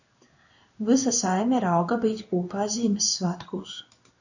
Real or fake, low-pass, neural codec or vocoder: fake; 7.2 kHz; codec, 16 kHz in and 24 kHz out, 1 kbps, XY-Tokenizer